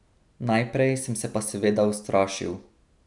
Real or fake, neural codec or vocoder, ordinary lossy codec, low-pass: real; none; none; 10.8 kHz